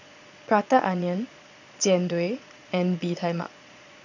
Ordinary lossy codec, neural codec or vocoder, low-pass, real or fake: none; vocoder, 22.05 kHz, 80 mel bands, Vocos; 7.2 kHz; fake